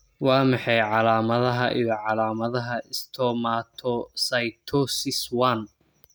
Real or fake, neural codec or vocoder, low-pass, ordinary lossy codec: real; none; none; none